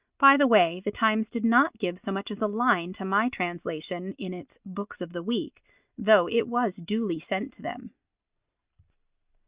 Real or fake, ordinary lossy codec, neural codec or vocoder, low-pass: real; Opus, 64 kbps; none; 3.6 kHz